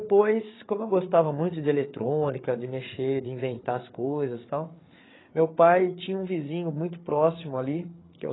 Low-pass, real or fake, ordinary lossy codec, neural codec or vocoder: 7.2 kHz; fake; AAC, 16 kbps; codec, 16 kHz, 8 kbps, FreqCodec, larger model